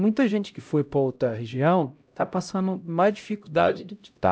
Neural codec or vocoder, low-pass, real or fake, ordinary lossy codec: codec, 16 kHz, 0.5 kbps, X-Codec, HuBERT features, trained on LibriSpeech; none; fake; none